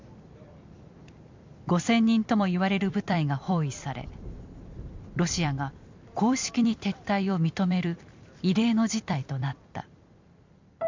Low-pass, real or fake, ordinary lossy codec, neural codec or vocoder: 7.2 kHz; fake; MP3, 64 kbps; vocoder, 44.1 kHz, 128 mel bands every 256 samples, BigVGAN v2